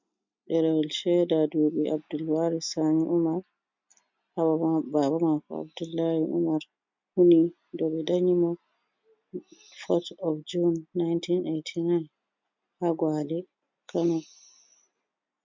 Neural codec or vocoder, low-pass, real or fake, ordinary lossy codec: none; 7.2 kHz; real; MP3, 64 kbps